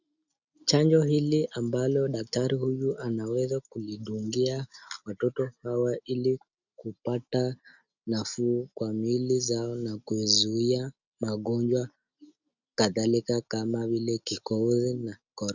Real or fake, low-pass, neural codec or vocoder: real; 7.2 kHz; none